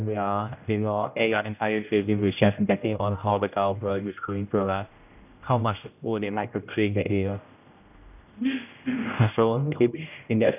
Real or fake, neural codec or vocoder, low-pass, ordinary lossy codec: fake; codec, 16 kHz, 0.5 kbps, X-Codec, HuBERT features, trained on general audio; 3.6 kHz; none